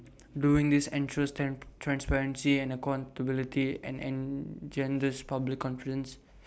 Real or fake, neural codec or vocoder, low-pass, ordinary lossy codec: real; none; none; none